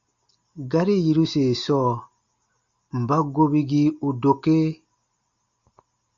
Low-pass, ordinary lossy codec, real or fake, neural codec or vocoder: 7.2 kHz; Opus, 64 kbps; real; none